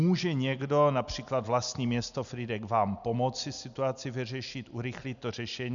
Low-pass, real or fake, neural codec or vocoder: 7.2 kHz; real; none